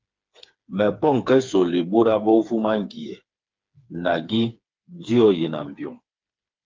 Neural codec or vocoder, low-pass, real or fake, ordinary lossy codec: codec, 16 kHz, 4 kbps, FreqCodec, smaller model; 7.2 kHz; fake; Opus, 24 kbps